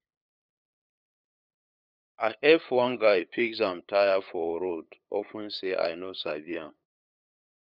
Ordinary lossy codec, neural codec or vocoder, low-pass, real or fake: none; codec, 16 kHz, 8 kbps, FunCodec, trained on LibriTTS, 25 frames a second; 5.4 kHz; fake